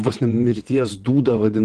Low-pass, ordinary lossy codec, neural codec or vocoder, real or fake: 9.9 kHz; Opus, 16 kbps; vocoder, 22.05 kHz, 80 mel bands, WaveNeXt; fake